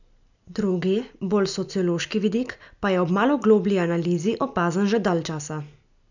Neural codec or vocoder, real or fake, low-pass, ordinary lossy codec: none; real; 7.2 kHz; none